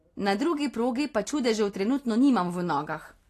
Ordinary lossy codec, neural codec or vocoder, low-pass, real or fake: AAC, 48 kbps; none; 14.4 kHz; real